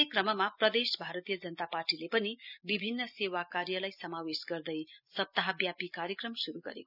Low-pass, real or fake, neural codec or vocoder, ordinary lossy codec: 5.4 kHz; real; none; none